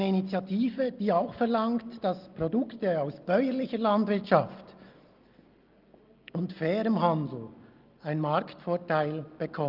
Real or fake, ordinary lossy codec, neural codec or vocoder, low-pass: real; Opus, 32 kbps; none; 5.4 kHz